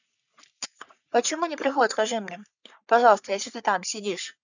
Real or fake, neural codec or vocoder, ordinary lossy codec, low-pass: fake; codec, 44.1 kHz, 3.4 kbps, Pupu-Codec; none; 7.2 kHz